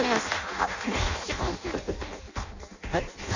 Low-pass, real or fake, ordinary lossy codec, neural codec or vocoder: 7.2 kHz; fake; none; codec, 16 kHz in and 24 kHz out, 0.6 kbps, FireRedTTS-2 codec